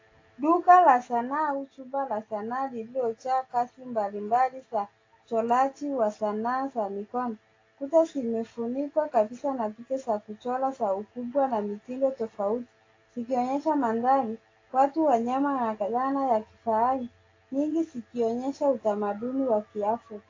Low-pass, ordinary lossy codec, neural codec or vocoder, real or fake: 7.2 kHz; AAC, 32 kbps; none; real